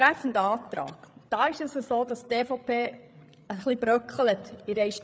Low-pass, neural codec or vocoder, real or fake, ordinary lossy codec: none; codec, 16 kHz, 8 kbps, FreqCodec, larger model; fake; none